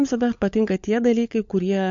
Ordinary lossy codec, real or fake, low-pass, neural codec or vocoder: MP3, 48 kbps; fake; 7.2 kHz; codec, 16 kHz, 16 kbps, FunCodec, trained on LibriTTS, 50 frames a second